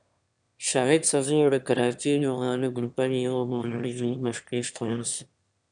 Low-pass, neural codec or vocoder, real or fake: 9.9 kHz; autoencoder, 22.05 kHz, a latent of 192 numbers a frame, VITS, trained on one speaker; fake